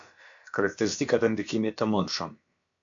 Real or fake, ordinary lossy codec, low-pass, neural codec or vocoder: fake; AAC, 64 kbps; 7.2 kHz; codec, 16 kHz, about 1 kbps, DyCAST, with the encoder's durations